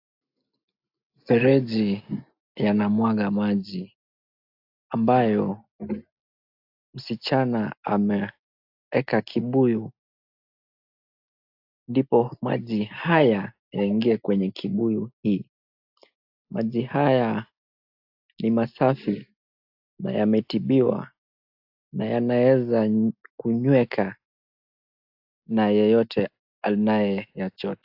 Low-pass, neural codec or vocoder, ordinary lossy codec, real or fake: 5.4 kHz; none; AAC, 48 kbps; real